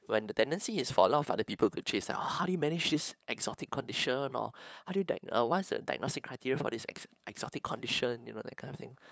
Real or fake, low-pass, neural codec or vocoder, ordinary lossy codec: fake; none; codec, 16 kHz, 8 kbps, FunCodec, trained on LibriTTS, 25 frames a second; none